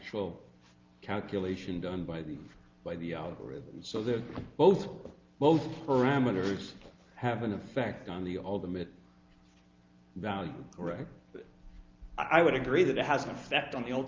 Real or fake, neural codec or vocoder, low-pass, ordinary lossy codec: real; none; 7.2 kHz; Opus, 24 kbps